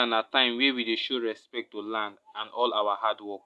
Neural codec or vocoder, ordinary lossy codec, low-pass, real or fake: none; none; none; real